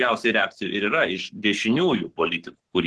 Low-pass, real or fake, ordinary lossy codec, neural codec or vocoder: 10.8 kHz; fake; Opus, 16 kbps; codec, 44.1 kHz, 7.8 kbps, Pupu-Codec